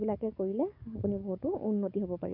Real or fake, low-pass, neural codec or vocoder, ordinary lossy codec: fake; 5.4 kHz; codec, 44.1 kHz, 7.8 kbps, DAC; none